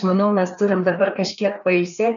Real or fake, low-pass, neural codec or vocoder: fake; 7.2 kHz; codec, 16 kHz, 2 kbps, FreqCodec, larger model